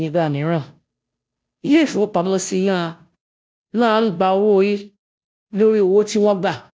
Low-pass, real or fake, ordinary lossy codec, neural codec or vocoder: none; fake; none; codec, 16 kHz, 0.5 kbps, FunCodec, trained on Chinese and English, 25 frames a second